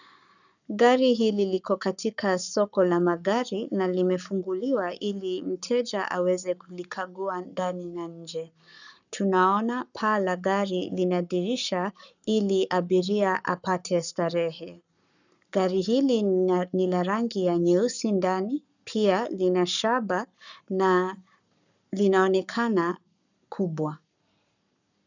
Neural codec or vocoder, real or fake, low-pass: codec, 44.1 kHz, 7.8 kbps, Pupu-Codec; fake; 7.2 kHz